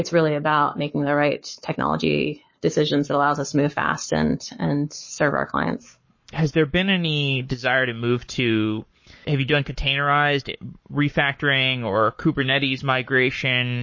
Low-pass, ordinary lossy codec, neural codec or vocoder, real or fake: 7.2 kHz; MP3, 32 kbps; codec, 44.1 kHz, 7.8 kbps, Pupu-Codec; fake